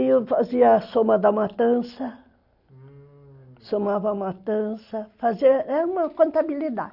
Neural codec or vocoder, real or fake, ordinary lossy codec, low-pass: none; real; none; 5.4 kHz